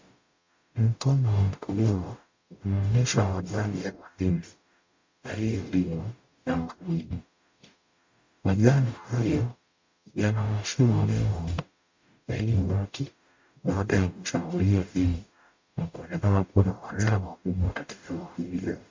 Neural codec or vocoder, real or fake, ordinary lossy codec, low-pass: codec, 44.1 kHz, 0.9 kbps, DAC; fake; MP3, 48 kbps; 7.2 kHz